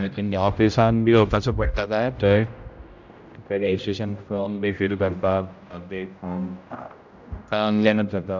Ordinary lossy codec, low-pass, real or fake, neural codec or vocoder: none; 7.2 kHz; fake; codec, 16 kHz, 0.5 kbps, X-Codec, HuBERT features, trained on general audio